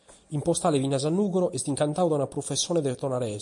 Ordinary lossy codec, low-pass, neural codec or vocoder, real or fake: MP3, 48 kbps; 10.8 kHz; none; real